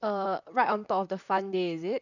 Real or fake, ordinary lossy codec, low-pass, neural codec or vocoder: fake; none; 7.2 kHz; vocoder, 44.1 kHz, 128 mel bands, Pupu-Vocoder